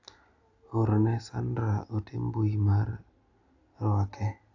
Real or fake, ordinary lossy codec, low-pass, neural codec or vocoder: real; AAC, 48 kbps; 7.2 kHz; none